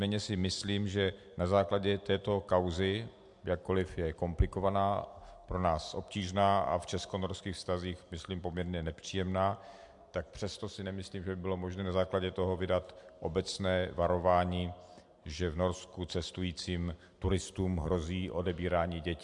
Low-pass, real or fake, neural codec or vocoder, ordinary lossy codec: 10.8 kHz; real; none; MP3, 64 kbps